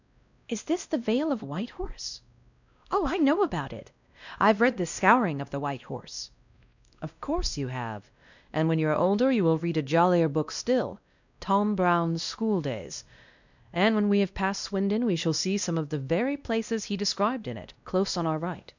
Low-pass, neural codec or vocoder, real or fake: 7.2 kHz; codec, 16 kHz, 1 kbps, X-Codec, WavLM features, trained on Multilingual LibriSpeech; fake